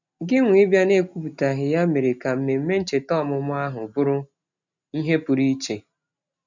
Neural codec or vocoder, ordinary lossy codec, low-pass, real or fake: none; none; 7.2 kHz; real